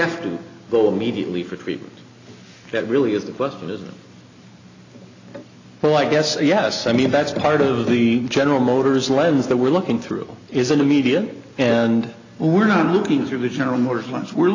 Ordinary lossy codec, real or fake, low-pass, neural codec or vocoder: AAC, 48 kbps; real; 7.2 kHz; none